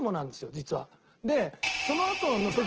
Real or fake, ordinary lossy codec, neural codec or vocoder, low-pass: real; Opus, 16 kbps; none; 7.2 kHz